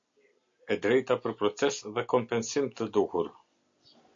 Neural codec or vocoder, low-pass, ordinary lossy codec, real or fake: none; 7.2 kHz; MP3, 48 kbps; real